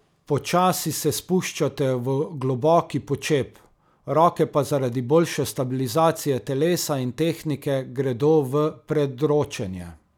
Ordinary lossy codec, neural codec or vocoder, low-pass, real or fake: none; none; 19.8 kHz; real